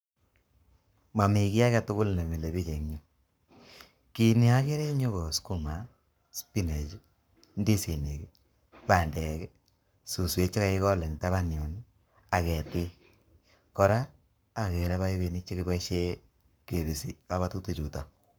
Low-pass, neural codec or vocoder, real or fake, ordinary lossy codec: none; codec, 44.1 kHz, 7.8 kbps, Pupu-Codec; fake; none